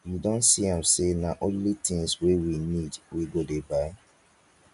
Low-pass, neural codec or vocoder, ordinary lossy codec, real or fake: 10.8 kHz; none; none; real